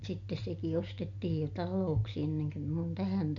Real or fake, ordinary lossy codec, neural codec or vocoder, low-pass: real; none; none; 7.2 kHz